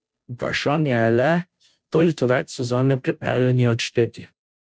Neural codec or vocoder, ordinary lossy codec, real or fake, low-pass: codec, 16 kHz, 0.5 kbps, FunCodec, trained on Chinese and English, 25 frames a second; none; fake; none